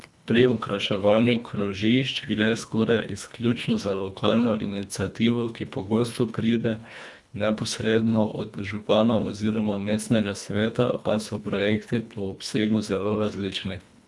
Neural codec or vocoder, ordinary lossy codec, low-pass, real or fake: codec, 24 kHz, 1.5 kbps, HILCodec; none; none; fake